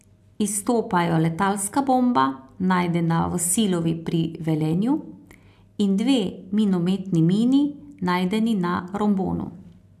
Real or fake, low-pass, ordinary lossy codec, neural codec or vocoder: real; 14.4 kHz; AAC, 96 kbps; none